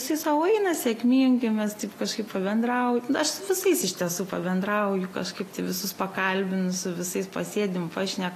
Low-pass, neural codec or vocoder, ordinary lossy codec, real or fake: 14.4 kHz; none; AAC, 48 kbps; real